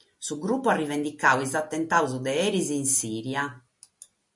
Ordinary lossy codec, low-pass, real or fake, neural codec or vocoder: MP3, 48 kbps; 10.8 kHz; real; none